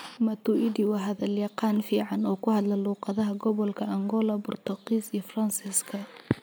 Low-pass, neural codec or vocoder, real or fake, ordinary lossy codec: none; none; real; none